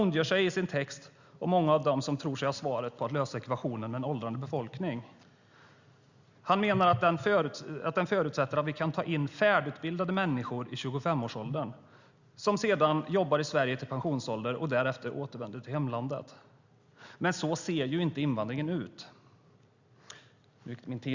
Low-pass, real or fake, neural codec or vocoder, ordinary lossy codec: 7.2 kHz; real; none; Opus, 64 kbps